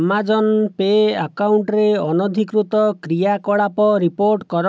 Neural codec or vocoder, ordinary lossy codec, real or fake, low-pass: none; none; real; none